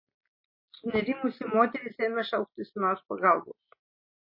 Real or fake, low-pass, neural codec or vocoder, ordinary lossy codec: real; 5.4 kHz; none; MP3, 24 kbps